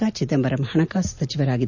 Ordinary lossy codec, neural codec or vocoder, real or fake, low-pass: none; none; real; 7.2 kHz